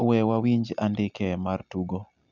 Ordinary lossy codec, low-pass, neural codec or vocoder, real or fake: none; 7.2 kHz; none; real